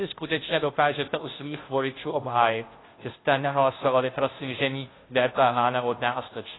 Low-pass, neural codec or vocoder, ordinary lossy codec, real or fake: 7.2 kHz; codec, 16 kHz, 0.5 kbps, FunCodec, trained on Chinese and English, 25 frames a second; AAC, 16 kbps; fake